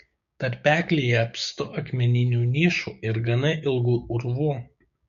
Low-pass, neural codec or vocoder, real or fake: 7.2 kHz; codec, 16 kHz, 6 kbps, DAC; fake